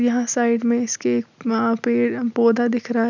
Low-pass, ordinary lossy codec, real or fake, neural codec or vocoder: 7.2 kHz; none; real; none